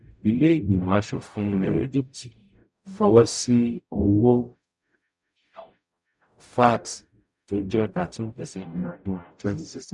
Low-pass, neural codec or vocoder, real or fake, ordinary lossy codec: 10.8 kHz; codec, 44.1 kHz, 0.9 kbps, DAC; fake; none